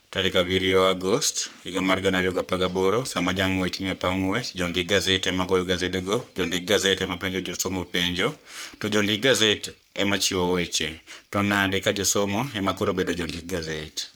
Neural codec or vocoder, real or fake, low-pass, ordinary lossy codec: codec, 44.1 kHz, 3.4 kbps, Pupu-Codec; fake; none; none